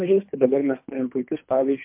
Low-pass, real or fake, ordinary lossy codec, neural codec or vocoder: 3.6 kHz; fake; MP3, 32 kbps; codec, 24 kHz, 3 kbps, HILCodec